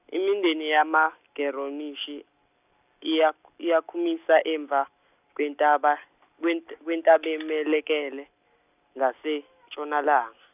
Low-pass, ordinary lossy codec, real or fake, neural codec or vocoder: 3.6 kHz; none; real; none